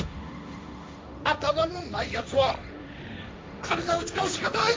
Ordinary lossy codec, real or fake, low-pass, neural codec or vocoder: none; fake; none; codec, 16 kHz, 1.1 kbps, Voila-Tokenizer